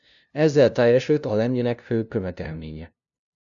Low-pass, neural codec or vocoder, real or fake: 7.2 kHz; codec, 16 kHz, 0.5 kbps, FunCodec, trained on LibriTTS, 25 frames a second; fake